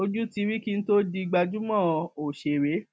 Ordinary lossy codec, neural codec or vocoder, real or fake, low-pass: none; none; real; none